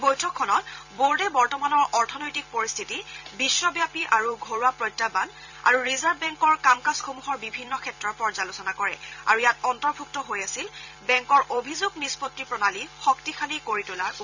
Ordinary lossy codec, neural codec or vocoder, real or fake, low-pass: none; vocoder, 44.1 kHz, 128 mel bands every 256 samples, BigVGAN v2; fake; 7.2 kHz